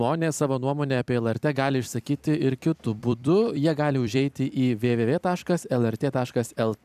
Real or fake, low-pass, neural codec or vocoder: fake; 14.4 kHz; vocoder, 44.1 kHz, 128 mel bands every 256 samples, BigVGAN v2